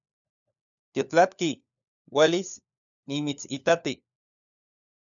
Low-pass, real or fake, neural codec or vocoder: 7.2 kHz; fake; codec, 16 kHz, 4 kbps, FunCodec, trained on LibriTTS, 50 frames a second